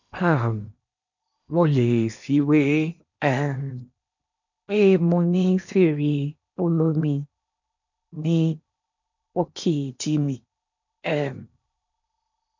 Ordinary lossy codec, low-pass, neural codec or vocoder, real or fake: none; 7.2 kHz; codec, 16 kHz in and 24 kHz out, 0.8 kbps, FocalCodec, streaming, 65536 codes; fake